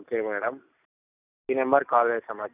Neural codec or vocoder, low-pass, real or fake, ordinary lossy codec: none; 3.6 kHz; real; none